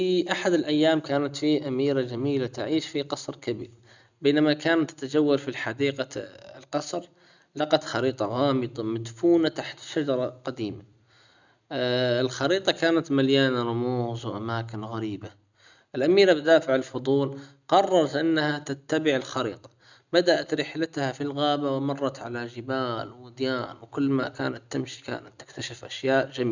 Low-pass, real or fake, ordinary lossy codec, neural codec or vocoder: 7.2 kHz; real; none; none